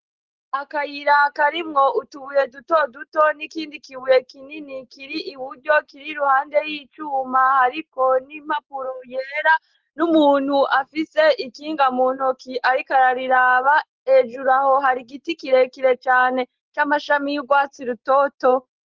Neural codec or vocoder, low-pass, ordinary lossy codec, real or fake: none; 7.2 kHz; Opus, 16 kbps; real